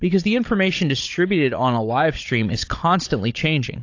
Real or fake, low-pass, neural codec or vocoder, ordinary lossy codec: fake; 7.2 kHz; codec, 16 kHz, 16 kbps, FunCodec, trained on Chinese and English, 50 frames a second; AAC, 48 kbps